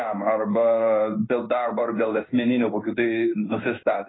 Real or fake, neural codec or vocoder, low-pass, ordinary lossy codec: fake; codec, 16 kHz in and 24 kHz out, 1 kbps, XY-Tokenizer; 7.2 kHz; AAC, 16 kbps